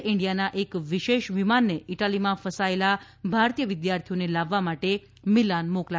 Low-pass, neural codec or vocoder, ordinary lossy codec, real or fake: none; none; none; real